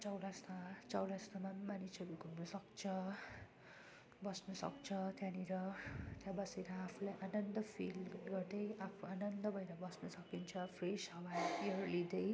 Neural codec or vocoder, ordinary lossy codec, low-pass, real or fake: none; none; none; real